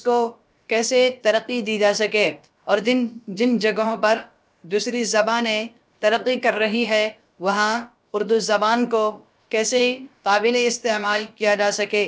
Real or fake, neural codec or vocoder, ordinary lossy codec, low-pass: fake; codec, 16 kHz, about 1 kbps, DyCAST, with the encoder's durations; none; none